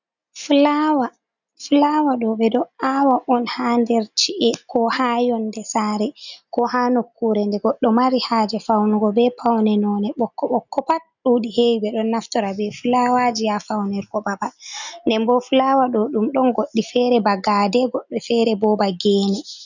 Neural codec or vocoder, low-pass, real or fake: none; 7.2 kHz; real